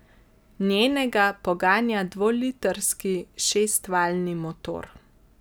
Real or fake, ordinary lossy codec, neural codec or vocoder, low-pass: real; none; none; none